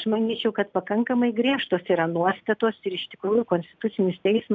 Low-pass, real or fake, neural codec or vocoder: 7.2 kHz; real; none